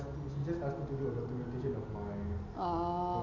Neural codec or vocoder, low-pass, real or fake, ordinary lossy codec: none; 7.2 kHz; real; none